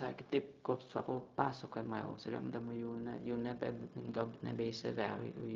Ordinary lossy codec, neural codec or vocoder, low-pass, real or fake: Opus, 32 kbps; codec, 16 kHz, 0.4 kbps, LongCat-Audio-Codec; 7.2 kHz; fake